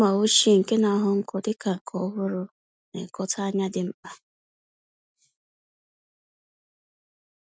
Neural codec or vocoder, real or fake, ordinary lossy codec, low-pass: none; real; none; none